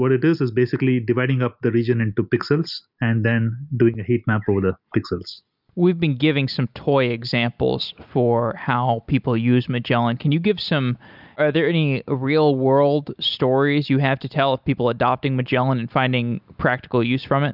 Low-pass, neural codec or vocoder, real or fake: 5.4 kHz; none; real